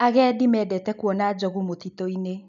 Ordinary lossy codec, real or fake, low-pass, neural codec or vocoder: none; real; 7.2 kHz; none